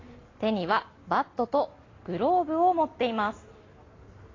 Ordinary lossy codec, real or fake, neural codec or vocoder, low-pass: AAC, 32 kbps; real; none; 7.2 kHz